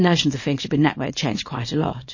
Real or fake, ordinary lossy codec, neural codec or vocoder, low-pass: fake; MP3, 32 kbps; codec, 24 kHz, 0.9 kbps, WavTokenizer, small release; 7.2 kHz